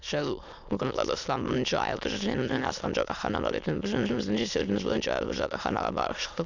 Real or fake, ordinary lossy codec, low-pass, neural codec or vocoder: fake; none; 7.2 kHz; autoencoder, 22.05 kHz, a latent of 192 numbers a frame, VITS, trained on many speakers